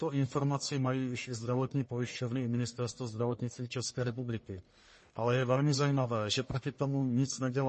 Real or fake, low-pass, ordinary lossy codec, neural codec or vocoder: fake; 10.8 kHz; MP3, 32 kbps; codec, 44.1 kHz, 1.7 kbps, Pupu-Codec